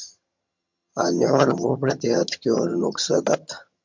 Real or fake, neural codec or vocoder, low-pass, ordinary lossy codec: fake; vocoder, 22.05 kHz, 80 mel bands, HiFi-GAN; 7.2 kHz; MP3, 64 kbps